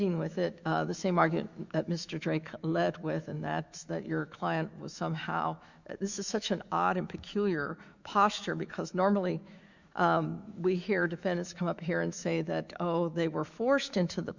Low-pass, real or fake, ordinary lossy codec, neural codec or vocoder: 7.2 kHz; fake; Opus, 64 kbps; autoencoder, 48 kHz, 128 numbers a frame, DAC-VAE, trained on Japanese speech